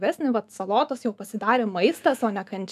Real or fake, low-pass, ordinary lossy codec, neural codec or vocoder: fake; 14.4 kHz; AAC, 96 kbps; autoencoder, 48 kHz, 128 numbers a frame, DAC-VAE, trained on Japanese speech